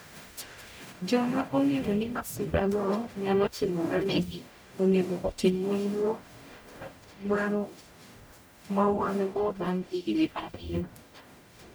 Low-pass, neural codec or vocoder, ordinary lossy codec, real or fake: none; codec, 44.1 kHz, 0.9 kbps, DAC; none; fake